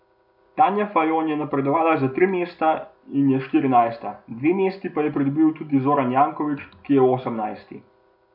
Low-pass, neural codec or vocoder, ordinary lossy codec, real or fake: 5.4 kHz; none; none; real